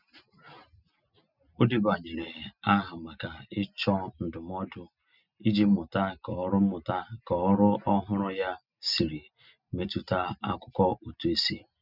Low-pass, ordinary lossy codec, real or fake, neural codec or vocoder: 5.4 kHz; none; real; none